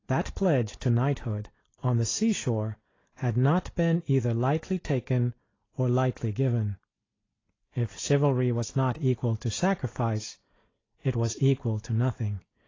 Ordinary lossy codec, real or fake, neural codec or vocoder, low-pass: AAC, 32 kbps; real; none; 7.2 kHz